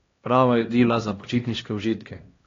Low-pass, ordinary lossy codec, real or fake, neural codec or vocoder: 7.2 kHz; AAC, 32 kbps; fake; codec, 16 kHz, 1 kbps, X-Codec, HuBERT features, trained on LibriSpeech